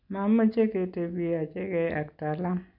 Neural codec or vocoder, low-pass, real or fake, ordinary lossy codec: none; 5.4 kHz; real; MP3, 48 kbps